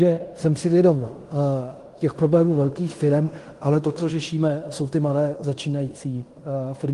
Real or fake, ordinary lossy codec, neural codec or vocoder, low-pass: fake; Opus, 24 kbps; codec, 16 kHz in and 24 kHz out, 0.9 kbps, LongCat-Audio-Codec, fine tuned four codebook decoder; 10.8 kHz